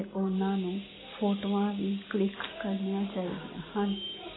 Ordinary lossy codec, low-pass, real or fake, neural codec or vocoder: AAC, 16 kbps; 7.2 kHz; real; none